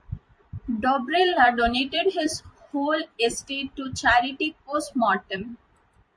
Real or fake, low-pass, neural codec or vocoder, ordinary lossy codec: fake; 9.9 kHz; vocoder, 44.1 kHz, 128 mel bands every 256 samples, BigVGAN v2; MP3, 48 kbps